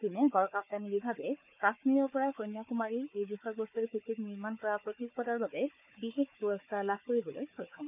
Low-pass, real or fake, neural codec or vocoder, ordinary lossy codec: 3.6 kHz; fake; codec, 16 kHz, 4 kbps, FunCodec, trained on Chinese and English, 50 frames a second; none